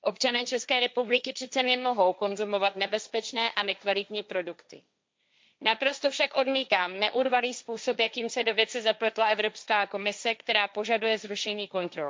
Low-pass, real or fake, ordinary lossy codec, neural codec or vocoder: none; fake; none; codec, 16 kHz, 1.1 kbps, Voila-Tokenizer